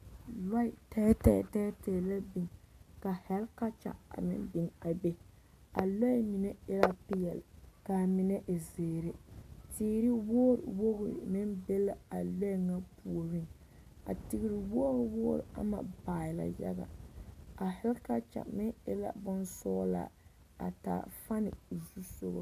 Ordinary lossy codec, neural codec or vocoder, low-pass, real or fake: AAC, 96 kbps; none; 14.4 kHz; real